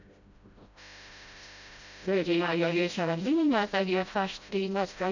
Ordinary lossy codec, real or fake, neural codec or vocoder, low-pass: none; fake; codec, 16 kHz, 0.5 kbps, FreqCodec, smaller model; 7.2 kHz